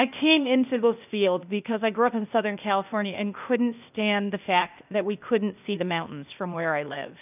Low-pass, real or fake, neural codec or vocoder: 3.6 kHz; fake; codec, 16 kHz, 0.8 kbps, ZipCodec